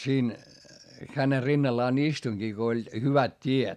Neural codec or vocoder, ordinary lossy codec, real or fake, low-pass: none; none; real; 14.4 kHz